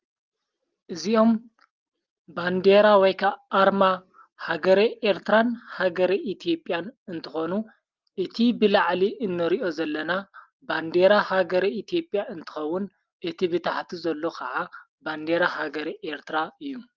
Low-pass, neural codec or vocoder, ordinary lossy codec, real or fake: 7.2 kHz; none; Opus, 32 kbps; real